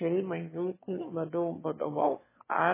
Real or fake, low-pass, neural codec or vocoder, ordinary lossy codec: fake; 3.6 kHz; autoencoder, 22.05 kHz, a latent of 192 numbers a frame, VITS, trained on one speaker; MP3, 16 kbps